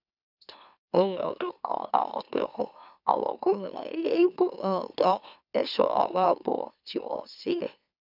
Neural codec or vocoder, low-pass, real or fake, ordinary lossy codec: autoencoder, 44.1 kHz, a latent of 192 numbers a frame, MeloTTS; 5.4 kHz; fake; none